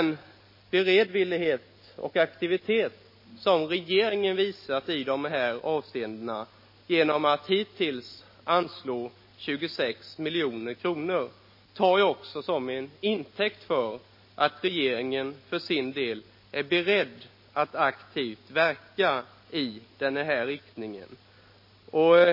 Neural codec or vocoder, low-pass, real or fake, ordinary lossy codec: none; 5.4 kHz; real; MP3, 24 kbps